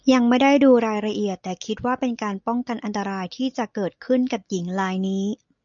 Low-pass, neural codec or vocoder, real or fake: 7.2 kHz; none; real